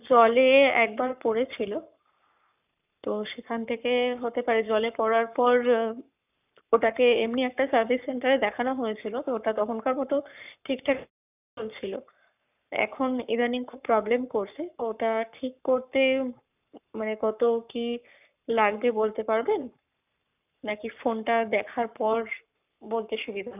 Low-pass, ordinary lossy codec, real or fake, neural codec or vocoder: 3.6 kHz; none; fake; codec, 44.1 kHz, 7.8 kbps, Pupu-Codec